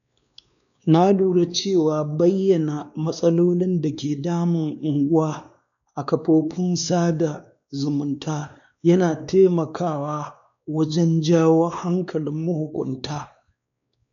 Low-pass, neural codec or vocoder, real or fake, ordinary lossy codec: 7.2 kHz; codec, 16 kHz, 2 kbps, X-Codec, WavLM features, trained on Multilingual LibriSpeech; fake; none